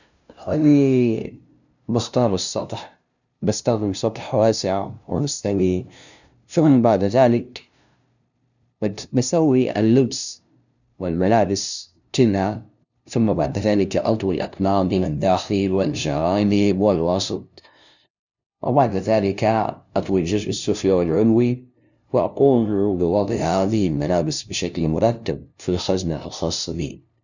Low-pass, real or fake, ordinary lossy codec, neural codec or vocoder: 7.2 kHz; fake; none; codec, 16 kHz, 0.5 kbps, FunCodec, trained on LibriTTS, 25 frames a second